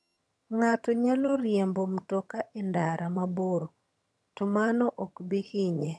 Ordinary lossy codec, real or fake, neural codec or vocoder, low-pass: none; fake; vocoder, 22.05 kHz, 80 mel bands, HiFi-GAN; none